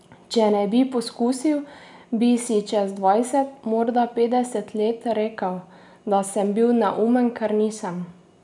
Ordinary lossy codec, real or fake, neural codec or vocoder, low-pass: none; real; none; 10.8 kHz